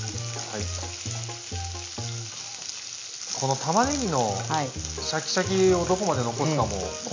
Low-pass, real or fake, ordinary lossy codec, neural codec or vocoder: 7.2 kHz; real; MP3, 64 kbps; none